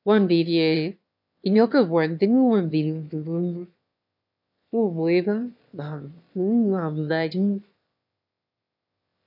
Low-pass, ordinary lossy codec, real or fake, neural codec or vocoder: 5.4 kHz; none; fake; autoencoder, 22.05 kHz, a latent of 192 numbers a frame, VITS, trained on one speaker